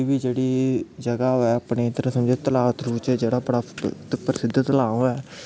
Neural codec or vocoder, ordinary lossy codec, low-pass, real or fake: none; none; none; real